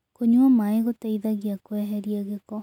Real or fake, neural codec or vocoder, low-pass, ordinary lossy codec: real; none; 19.8 kHz; none